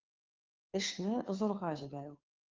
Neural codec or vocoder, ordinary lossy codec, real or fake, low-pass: codec, 16 kHz, 2 kbps, FunCodec, trained on Chinese and English, 25 frames a second; Opus, 32 kbps; fake; 7.2 kHz